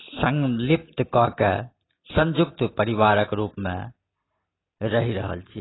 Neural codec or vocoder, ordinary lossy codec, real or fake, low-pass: none; AAC, 16 kbps; real; 7.2 kHz